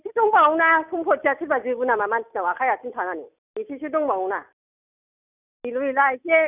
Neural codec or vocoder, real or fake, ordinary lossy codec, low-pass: none; real; none; 3.6 kHz